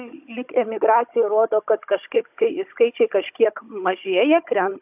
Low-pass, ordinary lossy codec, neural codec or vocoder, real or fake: 3.6 kHz; AAC, 32 kbps; codec, 16 kHz, 16 kbps, FunCodec, trained on Chinese and English, 50 frames a second; fake